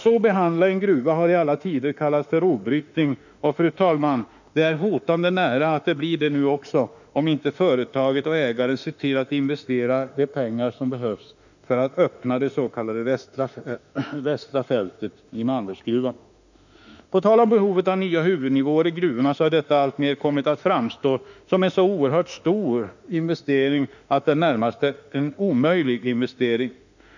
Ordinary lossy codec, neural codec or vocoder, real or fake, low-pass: none; autoencoder, 48 kHz, 32 numbers a frame, DAC-VAE, trained on Japanese speech; fake; 7.2 kHz